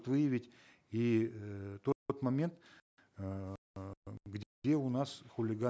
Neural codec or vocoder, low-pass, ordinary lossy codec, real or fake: none; none; none; real